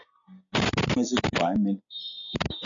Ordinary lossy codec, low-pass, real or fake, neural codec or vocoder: MP3, 64 kbps; 7.2 kHz; real; none